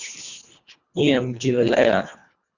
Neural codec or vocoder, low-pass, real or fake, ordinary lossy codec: codec, 24 kHz, 1.5 kbps, HILCodec; 7.2 kHz; fake; Opus, 64 kbps